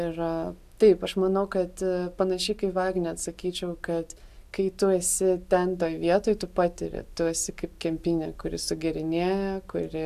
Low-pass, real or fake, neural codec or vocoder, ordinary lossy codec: 14.4 kHz; real; none; AAC, 96 kbps